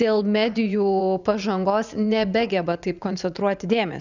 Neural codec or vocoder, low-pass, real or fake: vocoder, 44.1 kHz, 80 mel bands, Vocos; 7.2 kHz; fake